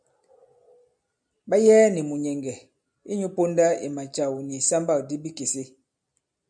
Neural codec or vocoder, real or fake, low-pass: none; real; 9.9 kHz